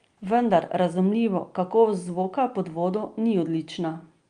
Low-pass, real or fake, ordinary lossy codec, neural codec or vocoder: 9.9 kHz; real; Opus, 32 kbps; none